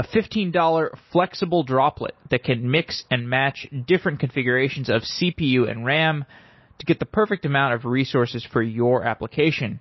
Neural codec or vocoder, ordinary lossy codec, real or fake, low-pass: none; MP3, 24 kbps; real; 7.2 kHz